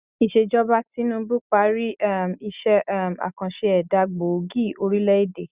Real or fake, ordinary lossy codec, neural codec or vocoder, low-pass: real; Opus, 64 kbps; none; 3.6 kHz